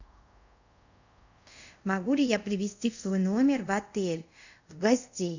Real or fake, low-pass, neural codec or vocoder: fake; 7.2 kHz; codec, 24 kHz, 0.5 kbps, DualCodec